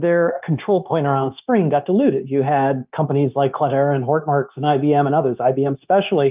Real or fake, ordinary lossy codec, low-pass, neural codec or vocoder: fake; Opus, 24 kbps; 3.6 kHz; codec, 16 kHz, 0.9 kbps, LongCat-Audio-Codec